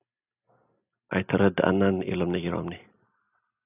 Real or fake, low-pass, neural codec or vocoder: real; 3.6 kHz; none